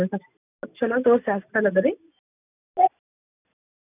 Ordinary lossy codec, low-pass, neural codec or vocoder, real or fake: none; 3.6 kHz; none; real